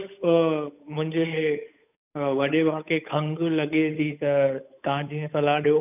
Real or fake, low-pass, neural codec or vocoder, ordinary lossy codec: fake; 3.6 kHz; codec, 16 kHz, 8 kbps, FunCodec, trained on Chinese and English, 25 frames a second; none